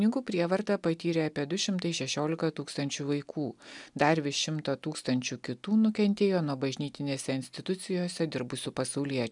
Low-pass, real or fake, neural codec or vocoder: 10.8 kHz; real; none